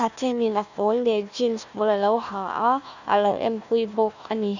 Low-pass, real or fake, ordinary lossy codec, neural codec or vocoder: 7.2 kHz; fake; none; codec, 16 kHz, 1 kbps, FunCodec, trained on Chinese and English, 50 frames a second